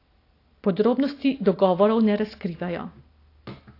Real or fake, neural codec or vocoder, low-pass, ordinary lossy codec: real; none; 5.4 kHz; AAC, 32 kbps